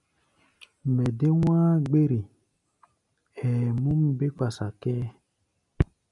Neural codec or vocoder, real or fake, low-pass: none; real; 10.8 kHz